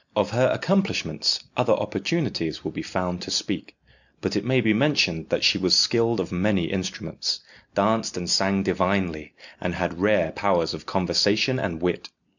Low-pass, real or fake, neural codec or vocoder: 7.2 kHz; real; none